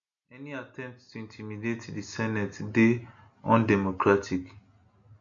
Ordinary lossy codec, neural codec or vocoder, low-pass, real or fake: none; none; 7.2 kHz; real